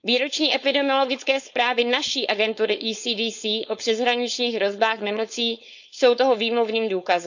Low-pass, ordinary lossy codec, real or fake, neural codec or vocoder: 7.2 kHz; none; fake; codec, 16 kHz, 4.8 kbps, FACodec